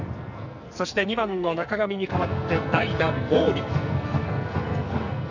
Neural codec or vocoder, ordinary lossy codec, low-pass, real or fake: codec, 44.1 kHz, 2.6 kbps, SNAC; none; 7.2 kHz; fake